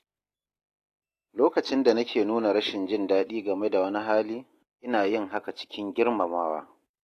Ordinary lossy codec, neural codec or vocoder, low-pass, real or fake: AAC, 48 kbps; none; 14.4 kHz; real